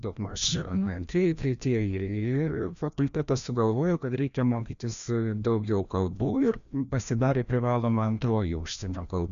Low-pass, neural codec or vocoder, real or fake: 7.2 kHz; codec, 16 kHz, 1 kbps, FreqCodec, larger model; fake